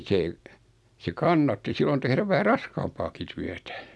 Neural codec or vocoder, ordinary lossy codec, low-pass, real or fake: none; none; none; real